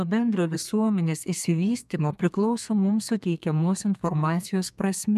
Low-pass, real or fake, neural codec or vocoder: 14.4 kHz; fake; codec, 32 kHz, 1.9 kbps, SNAC